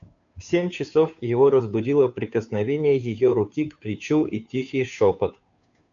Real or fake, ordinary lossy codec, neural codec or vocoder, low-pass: fake; AAC, 64 kbps; codec, 16 kHz, 2 kbps, FunCodec, trained on Chinese and English, 25 frames a second; 7.2 kHz